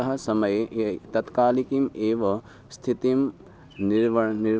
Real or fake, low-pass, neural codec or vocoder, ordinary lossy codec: real; none; none; none